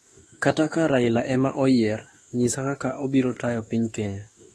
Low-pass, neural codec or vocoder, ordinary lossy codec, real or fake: 19.8 kHz; autoencoder, 48 kHz, 32 numbers a frame, DAC-VAE, trained on Japanese speech; AAC, 32 kbps; fake